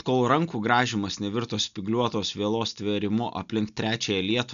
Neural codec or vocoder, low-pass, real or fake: none; 7.2 kHz; real